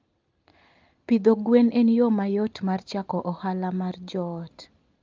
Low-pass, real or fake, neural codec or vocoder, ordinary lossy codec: 7.2 kHz; real; none; Opus, 24 kbps